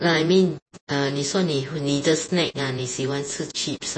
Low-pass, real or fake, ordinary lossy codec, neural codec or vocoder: 9.9 kHz; fake; MP3, 32 kbps; vocoder, 48 kHz, 128 mel bands, Vocos